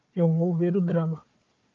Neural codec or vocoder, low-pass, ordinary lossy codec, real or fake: codec, 16 kHz, 4 kbps, FunCodec, trained on Chinese and English, 50 frames a second; 7.2 kHz; Opus, 24 kbps; fake